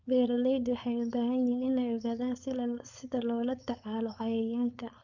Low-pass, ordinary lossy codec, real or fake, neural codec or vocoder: 7.2 kHz; none; fake; codec, 16 kHz, 4.8 kbps, FACodec